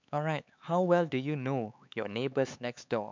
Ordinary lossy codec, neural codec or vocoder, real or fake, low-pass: MP3, 64 kbps; codec, 16 kHz, 4 kbps, X-Codec, HuBERT features, trained on LibriSpeech; fake; 7.2 kHz